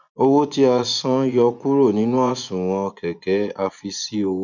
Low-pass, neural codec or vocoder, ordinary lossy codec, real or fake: 7.2 kHz; vocoder, 24 kHz, 100 mel bands, Vocos; none; fake